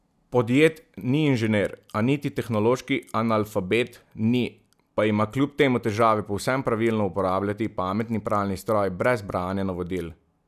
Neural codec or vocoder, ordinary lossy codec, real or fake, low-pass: none; none; real; 14.4 kHz